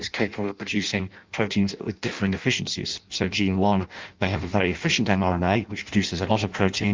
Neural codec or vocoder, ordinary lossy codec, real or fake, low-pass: codec, 16 kHz in and 24 kHz out, 0.6 kbps, FireRedTTS-2 codec; Opus, 32 kbps; fake; 7.2 kHz